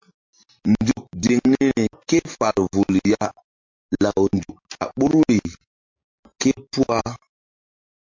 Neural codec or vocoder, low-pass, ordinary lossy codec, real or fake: none; 7.2 kHz; MP3, 48 kbps; real